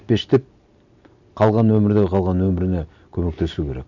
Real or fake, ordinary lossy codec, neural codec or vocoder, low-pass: real; none; none; 7.2 kHz